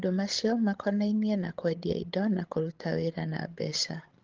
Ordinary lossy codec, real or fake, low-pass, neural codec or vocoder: Opus, 16 kbps; fake; 7.2 kHz; codec, 16 kHz, 16 kbps, FreqCodec, larger model